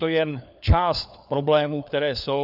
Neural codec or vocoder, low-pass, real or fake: codec, 16 kHz, 4 kbps, FunCodec, trained on LibriTTS, 50 frames a second; 5.4 kHz; fake